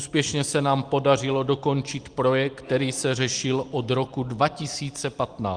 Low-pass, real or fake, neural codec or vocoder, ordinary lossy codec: 9.9 kHz; real; none; Opus, 24 kbps